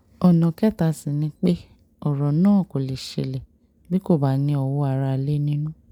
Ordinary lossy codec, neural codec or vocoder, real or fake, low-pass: none; none; real; 19.8 kHz